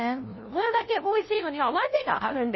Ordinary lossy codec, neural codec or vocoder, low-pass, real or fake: MP3, 24 kbps; codec, 16 kHz, 0.5 kbps, FunCodec, trained on LibriTTS, 25 frames a second; 7.2 kHz; fake